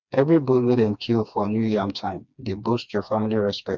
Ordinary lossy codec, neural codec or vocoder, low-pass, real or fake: none; codec, 16 kHz, 2 kbps, FreqCodec, smaller model; 7.2 kHz; fake